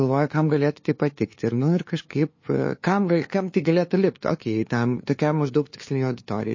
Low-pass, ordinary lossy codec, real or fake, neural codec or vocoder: 7.2 kHz; MP3, 32 kbps; fake; codec, 16 kHz, 2 kbps, FunCodec, trained on LibriTTS, 25 frames a second